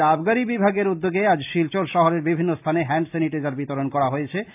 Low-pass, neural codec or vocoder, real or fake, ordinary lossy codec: 3.6 kHz; none; real; AAC, 32 kbps